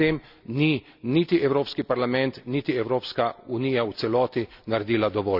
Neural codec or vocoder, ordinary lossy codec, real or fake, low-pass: none; none; real; 5.4 kHz